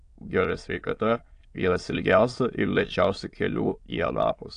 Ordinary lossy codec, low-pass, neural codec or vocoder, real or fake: AAC, 48 kbps; 9.9 kHz; autoencoder, 22.05 kHz, a latent of 192 numbers a frame, VITS, trained on many speakers; fake